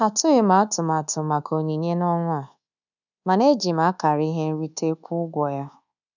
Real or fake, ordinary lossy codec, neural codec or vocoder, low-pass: fake; none; codec, 24 kHz, 1.2 kbps, DualCodec; 7.2 kHz